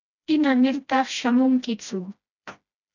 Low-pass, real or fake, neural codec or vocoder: 7.2 kHz; fake; codec, 16 kHz, 1 kbps, FreqCodec, smaller model